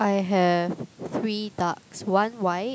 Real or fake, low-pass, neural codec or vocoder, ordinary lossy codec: real; none; none; none